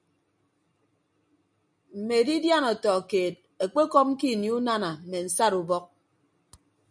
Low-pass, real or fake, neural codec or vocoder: 9.9 kHz; real; none